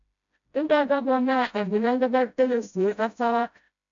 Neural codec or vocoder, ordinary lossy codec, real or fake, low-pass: codec, 16 kHz, 0.5 kbps, FreqCodec, smaller model; AAC, 64 kbps; fake; 7.2 kHz